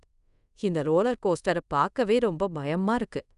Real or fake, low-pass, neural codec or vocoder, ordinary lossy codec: fake; 10.8 kHz; codec, 24 kHz, 0.5 kbps, DualCodec; none